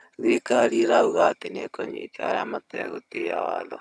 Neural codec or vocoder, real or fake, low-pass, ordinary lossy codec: vocoder, 22.05 kHz, 80 mel bands, HiFi-GAN; fake; none; none